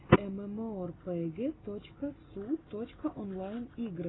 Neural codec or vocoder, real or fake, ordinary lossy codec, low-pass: none; real; AAC, 16 kbps; 7.2 kHz